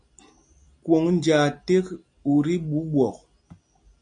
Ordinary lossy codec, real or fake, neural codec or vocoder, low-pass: MP3, 64 kbps; real; none; 9.9 kHz